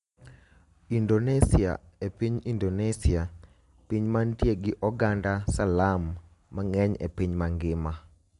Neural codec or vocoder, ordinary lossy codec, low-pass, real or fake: none; MP3, 64 kbps; 10.8 kHz; real